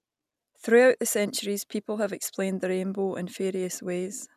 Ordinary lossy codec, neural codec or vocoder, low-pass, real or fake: none; none; 14.4 kHz; real